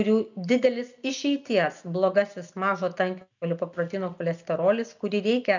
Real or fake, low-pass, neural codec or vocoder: real; 7.2 kHz; none